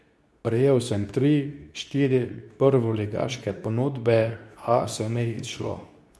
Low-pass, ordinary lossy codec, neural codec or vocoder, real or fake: none; none; codec, 24 kHz, 0.9 kbps, WavTokenizer, medium speech release version 2; fake